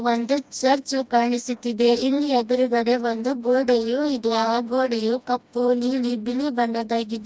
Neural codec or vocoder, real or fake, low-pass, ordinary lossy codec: codec, 16 kHz, 1 kbps, FreqCodec, smaller model; fake; none; none